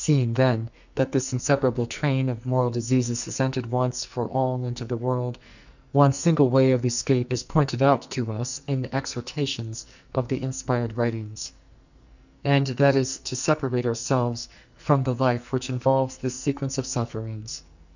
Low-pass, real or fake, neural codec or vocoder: 7.2 kHz; fake; codec, 44.1 kHz, 2.6 kbps, SNAC